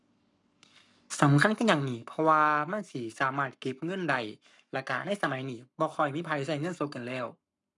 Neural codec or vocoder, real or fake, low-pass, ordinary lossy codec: codec, 44.1 kHz, 7.8 kbps, Pupu-Codec; fake; 10.8 kHz; none